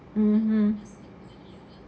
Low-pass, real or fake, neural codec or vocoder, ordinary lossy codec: none; real; none; none